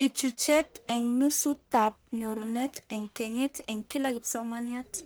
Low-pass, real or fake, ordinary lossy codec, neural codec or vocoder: none; fake; none; codec, 44.1 kHz, 1.7 kbps, Pupu-Codec